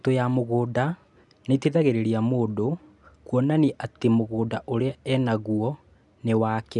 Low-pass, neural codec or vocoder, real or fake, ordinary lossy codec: 10.8 kHz; none; real; none